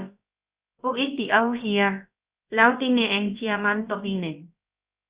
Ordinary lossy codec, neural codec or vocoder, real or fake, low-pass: Opus, 64 kbps; codec, 16 kHz, about 1 kbps, DyCAST, with the encoder's durations; fake; 3.6 kHz